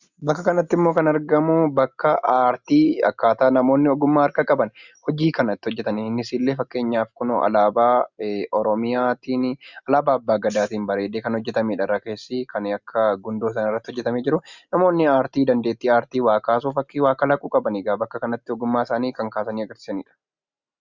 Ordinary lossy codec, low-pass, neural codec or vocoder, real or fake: Opus, 64 kbps; 7.2 kHz; none; real